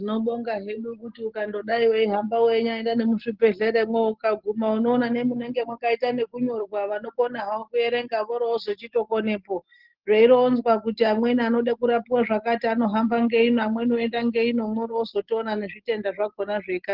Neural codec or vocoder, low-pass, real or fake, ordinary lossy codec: none; 5.4 kHz; real; Opus, 16 kbps